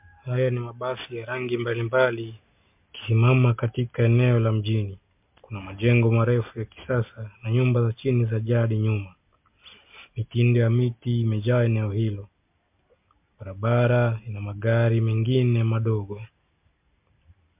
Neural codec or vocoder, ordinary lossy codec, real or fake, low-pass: none; MP3, 32 kbps; real; 3.6 kHz